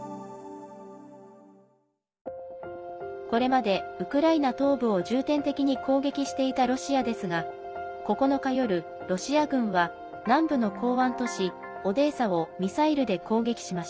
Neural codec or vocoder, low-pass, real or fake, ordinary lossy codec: none; none; real; none